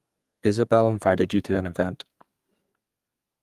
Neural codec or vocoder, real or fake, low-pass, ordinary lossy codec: codec, 32 kHz, 1.9 kbps, SNAC; fake; 14.4 kHz; Opus, 32 kbps